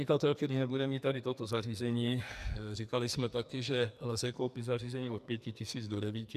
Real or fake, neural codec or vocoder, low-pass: fake; codec, 44.1 kHz, 2.6 kbps, SNAC; 14.4 kHz